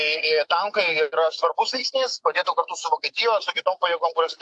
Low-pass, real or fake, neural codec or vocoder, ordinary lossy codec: 10.8 kHz; fake; autoencoder, 48 kHz, 128 numbers a frame, DAC-VAE, trained on Japanese speech; AAC, 64 kbps